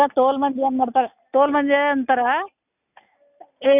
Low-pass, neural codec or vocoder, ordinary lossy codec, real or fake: 3.6 kHz; none; none; real